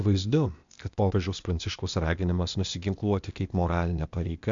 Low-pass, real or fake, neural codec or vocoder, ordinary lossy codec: 7.2 kHz; fake; codec, 16 kHz, 0.8 kbps, ZipCodec; MP3, 64 kbps